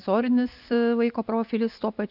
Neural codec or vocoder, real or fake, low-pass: none; real; 5.4 kHz